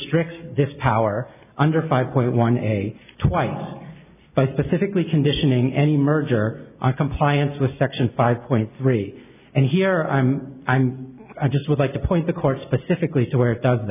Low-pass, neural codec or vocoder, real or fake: 3.6 kHz; none; real